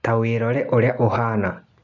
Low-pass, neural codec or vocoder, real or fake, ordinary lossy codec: 7.2 kHz; none; real; none